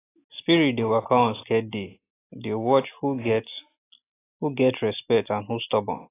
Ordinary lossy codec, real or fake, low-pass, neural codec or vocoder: AAC, 16 kbps; real; 3.6 kHz; none